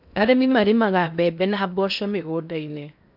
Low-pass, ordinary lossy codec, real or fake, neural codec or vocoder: 5.4 kHz; none; fake; codec, 16 kHz in and 24 kHz out, 0.8 kbps, FocalCodec, streaming, 65536 codes